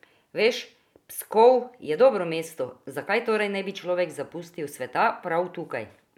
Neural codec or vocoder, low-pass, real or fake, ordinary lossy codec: none; 19.8 kHz; real; none